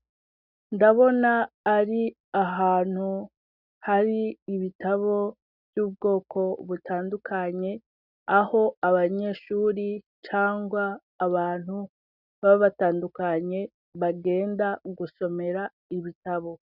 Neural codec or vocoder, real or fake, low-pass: none; real; 5.4 kHz